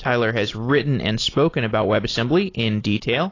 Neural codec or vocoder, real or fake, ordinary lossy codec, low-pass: none; real; AAC, 32 kbps; 7.2 kHz